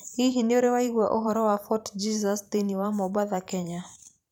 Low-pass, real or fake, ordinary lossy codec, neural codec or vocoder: 19.8 kHz; fake; none; vocoder, 44.1 kHz, 128 mel bands every 512 samples, BigVGAN v2